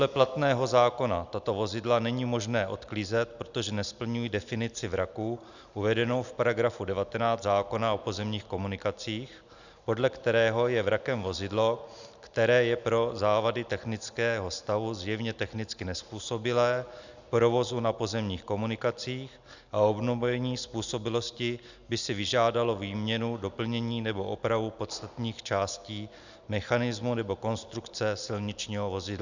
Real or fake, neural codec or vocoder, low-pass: real; none; 7.2 kHz